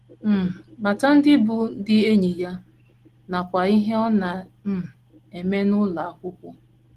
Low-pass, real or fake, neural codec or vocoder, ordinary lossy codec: 14.4 kHz; real; none; Opus, 16 kbps